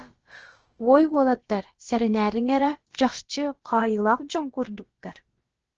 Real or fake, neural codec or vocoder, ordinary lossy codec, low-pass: fake; codec, 16 kHz, about 1 kbps, DyCAST, with the encoder's durations; Opus, 16 kbps; 7.2 kHz